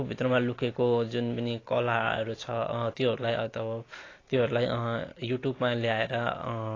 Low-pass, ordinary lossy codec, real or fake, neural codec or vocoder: 7.2 kHz; AAC, 32 kbps; real; none